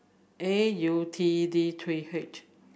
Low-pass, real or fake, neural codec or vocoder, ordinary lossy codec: none; real; none; none